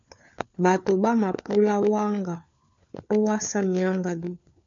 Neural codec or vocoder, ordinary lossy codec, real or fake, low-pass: codec, 16 kHz, 4 kbps, FunCodec, trained on LibriTTS, 50 frames a second; MP3, 64 kbps; fake; 7.2 kHz